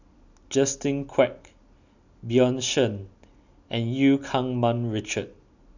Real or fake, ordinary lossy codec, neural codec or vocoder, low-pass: real; none; none; 7.2 kHz